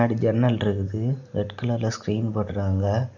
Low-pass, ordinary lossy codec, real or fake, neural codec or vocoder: 7.2 kHz; none; real; none